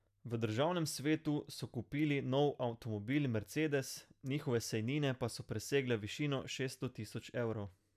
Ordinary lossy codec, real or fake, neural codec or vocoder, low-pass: none; real; none; 14.4 kHz